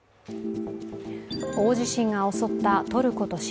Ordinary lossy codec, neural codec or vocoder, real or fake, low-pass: none; none; real; none